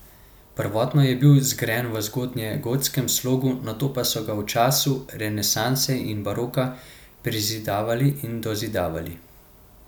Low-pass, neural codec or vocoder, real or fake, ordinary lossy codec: none; none; real; none